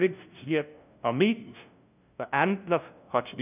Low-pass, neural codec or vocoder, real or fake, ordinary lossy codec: 3.6 kHz; codec, 16 kHz, 0.5 kbps, FunCodec, trained on LibriTTS, 25 frames a second; fake; none